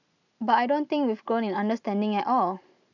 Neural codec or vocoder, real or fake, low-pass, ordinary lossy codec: none; real; 7.2 kHz; none